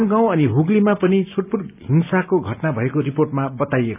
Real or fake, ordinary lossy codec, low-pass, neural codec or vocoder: real; none; 3.6 kHz; none